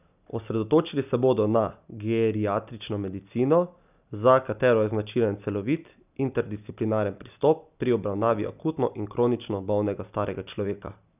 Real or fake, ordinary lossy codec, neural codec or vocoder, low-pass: real; none; none; 3.6 kHz